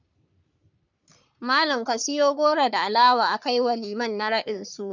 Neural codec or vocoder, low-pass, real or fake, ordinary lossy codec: codec, 44.1 kHz, 3.4 kbps, Pupu-Codec; 7.2 kHz; fake; none